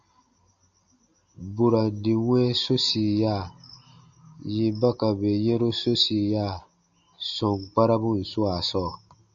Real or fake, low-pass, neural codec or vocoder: real; 7.2 kHz; none